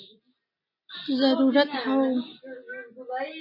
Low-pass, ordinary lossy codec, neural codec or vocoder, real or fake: 5.4 kHz; MP3, 24 kbps; none; real